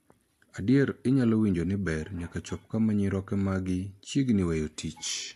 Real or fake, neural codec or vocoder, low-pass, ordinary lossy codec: real; none; 14.4 kHz; AAC, 64 kbps